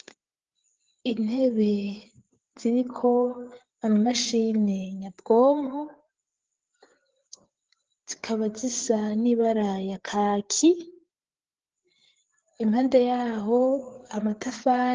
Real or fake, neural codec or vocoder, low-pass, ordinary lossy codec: fake; codec, 16 kHz, 4 kbps, FreqCodec, larger model; 7.2 kHz; Opus, 16 kbps